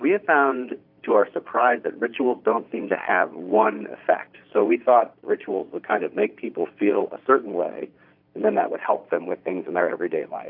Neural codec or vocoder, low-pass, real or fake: vocoder, 22.05 kHz, 80 mel bands, Vocos; 5.4 kHz; fake